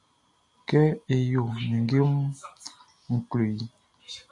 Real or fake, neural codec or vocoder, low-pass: real; none; 10.8 kHz